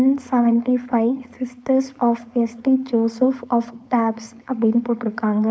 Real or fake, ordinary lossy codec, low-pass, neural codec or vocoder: fake; none; none; codec, 16 kHz, 4.8 kbps, FACodec